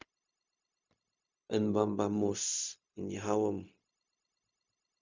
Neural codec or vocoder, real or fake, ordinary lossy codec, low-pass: codec, 16 kHz, 0.4 kbps, LongCat-Audio-Codec; fake; MP3, 64 kbps; 7.2 kHz